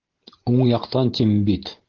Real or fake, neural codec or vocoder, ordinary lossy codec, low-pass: real; none; Opus, 16 kbps; 7.2 kHz